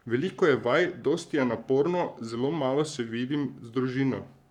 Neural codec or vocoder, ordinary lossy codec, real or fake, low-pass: codec, 44.1 kHz, 7.8 kbps, Pupu-Codec; none; fake; 19.8 kHz